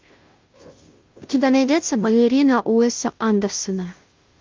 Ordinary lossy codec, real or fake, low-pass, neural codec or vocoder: Opus, 24 kbps; fake; 7.2 kHz; codec, 16 kHz, 0.5 kbps, FunCodec, trained on Chinese and English, 25 frames a second